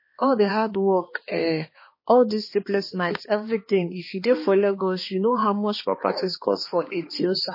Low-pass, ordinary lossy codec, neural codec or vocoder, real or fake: 5.4 kHz; MP3, 24 kbps; codec, 16 kHz, 2 kbps, X-Codec, HuBERT features, trained on balanced general audio; fake